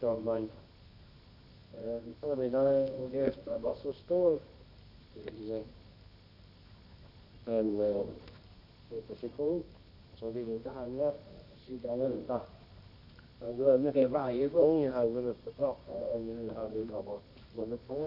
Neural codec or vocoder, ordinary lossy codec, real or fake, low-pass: codec, 24 kHz, 0.9 kbps, WavTokenizer, medium music audio release; none; fake; 5.4 kHz